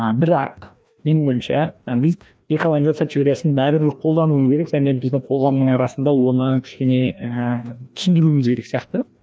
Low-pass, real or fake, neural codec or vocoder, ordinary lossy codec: none; fake; codec, 16 kHz, 1 kbps, FreqCodec, larger model; none